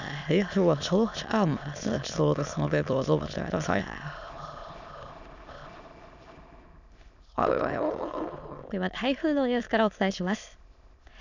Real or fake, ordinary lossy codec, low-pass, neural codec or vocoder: fake; none; 7.2 kHz; autoencoder, 22.05 kHz, a latent of 192 numbers a frame, VITS, trained on many speakers